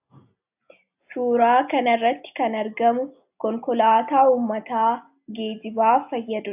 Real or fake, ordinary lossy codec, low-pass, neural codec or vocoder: real; AAC, 32 kbps; 3.6 kHz; none